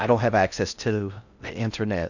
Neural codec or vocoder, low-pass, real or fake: codec, 16 kHz in and 24 kHz out, 0.6 kbps, FocalCodec, streaming, 4096 codes; 7.2 kHz; fake